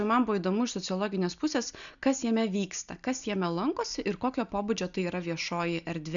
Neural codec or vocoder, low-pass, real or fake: none; 7.2 kHz; real